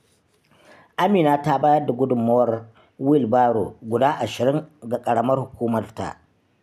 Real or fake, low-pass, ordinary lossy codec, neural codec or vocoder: real; 14.4 kHz; none; none